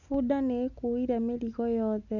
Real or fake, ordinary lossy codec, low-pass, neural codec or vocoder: real; none; 7.2 kHz; none